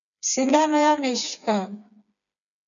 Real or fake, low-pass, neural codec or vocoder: fake; 7.2 kHz; codec, 16 kHz, 4 kbps, FreqCodec, smaller model